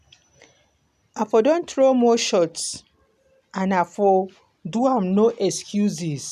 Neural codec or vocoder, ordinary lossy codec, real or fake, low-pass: none; none; real; 14.4 kHz